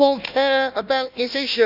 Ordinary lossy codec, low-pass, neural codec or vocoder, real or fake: none; 5.4 kHz; codec, 16 kHz, 1 kbps, FunCodec, trained on Chinese and English, 50 frames a second; fake